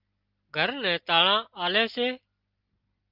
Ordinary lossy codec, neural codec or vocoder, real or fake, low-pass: Opus, 32 kbps; none; real; 5.4 kHz